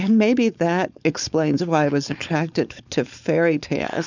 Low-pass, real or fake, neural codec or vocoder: 7.2 kHz; fake; codec, 16 kHz, 4.8 kbps, FACodec